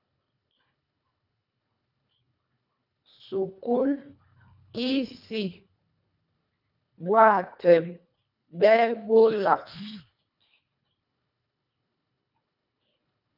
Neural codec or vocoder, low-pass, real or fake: codec, 24 kHz, 1.5 kbps, HILCodec; 5.4 kHz; fake